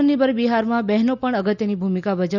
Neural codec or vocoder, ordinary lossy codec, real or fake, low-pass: none; none; real; 7.2 kHz